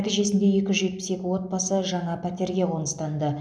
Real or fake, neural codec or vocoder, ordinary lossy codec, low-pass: real; none; none; none